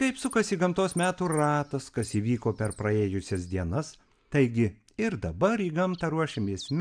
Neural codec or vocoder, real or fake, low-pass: none; real; 9.9 kHz